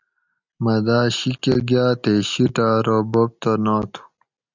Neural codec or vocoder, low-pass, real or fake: none; 7.2 kHz; real